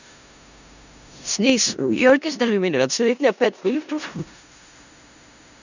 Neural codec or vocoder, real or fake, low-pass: codec, 16 kHz in and 24 kHz out, 0.4 kbps, LongCat-Audio-Codec, four codebook decoder; fake; 7.2 kHz